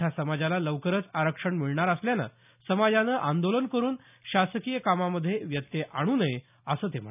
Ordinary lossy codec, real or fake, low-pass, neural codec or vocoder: none; real; 3.6 kHz; none